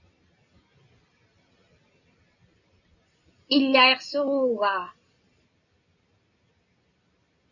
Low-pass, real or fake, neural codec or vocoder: 7.2 kHz; real; none